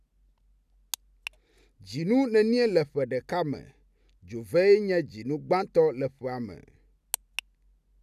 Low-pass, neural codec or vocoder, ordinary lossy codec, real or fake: 14.4 kHz; none; none; real